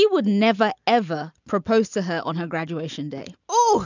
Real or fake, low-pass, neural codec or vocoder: real; 7.2 kHz; none